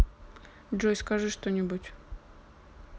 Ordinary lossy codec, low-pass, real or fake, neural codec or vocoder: none; none; real; none